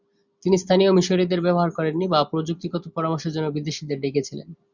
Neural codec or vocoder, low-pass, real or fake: none; 7.2 kHz; real